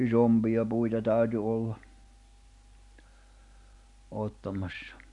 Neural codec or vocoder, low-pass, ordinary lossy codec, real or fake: none; 10.8 kHz; none; real